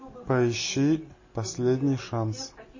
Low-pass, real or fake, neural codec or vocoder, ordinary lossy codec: 7.2 kHz; real; none; MP3, 32 kbps